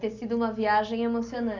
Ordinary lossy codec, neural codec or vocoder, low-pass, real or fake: MP3, 48 kbps; none; 7.2 kHz; real